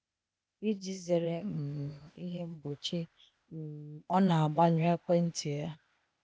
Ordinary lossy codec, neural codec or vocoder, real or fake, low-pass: none; codec, 16 kHz, 0.8 kbps, ZipCodec; fake; none